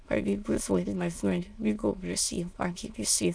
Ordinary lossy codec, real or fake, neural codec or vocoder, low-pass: none; fake; autoencoder, 22.05 kHz, a latent of 192 numbers a frame, VITS, trained on many speakers; none